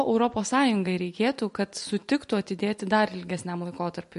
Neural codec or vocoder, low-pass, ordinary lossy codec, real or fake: none; 14.4 kHz; MP3, 48 kbps; real